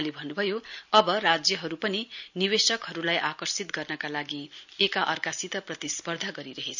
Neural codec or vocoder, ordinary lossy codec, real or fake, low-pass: none; none; real; 7.2 kHz